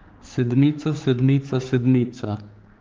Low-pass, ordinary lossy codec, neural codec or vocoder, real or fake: 7.2 kHz; Opus, 24 kbps; codec, 16 kHz, 4 kbps, X-Codec, HuBERT features, trained on general audio; fake